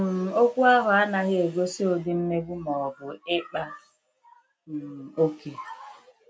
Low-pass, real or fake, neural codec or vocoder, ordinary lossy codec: none; real; none; none